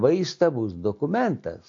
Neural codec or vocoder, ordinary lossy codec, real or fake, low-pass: none; MP3, 48 kbps; real; 7.2 kHz